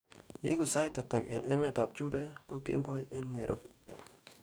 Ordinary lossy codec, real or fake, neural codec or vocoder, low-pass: none; fake; codec, 44.1 kHz, 2.6 kbps, DAC; none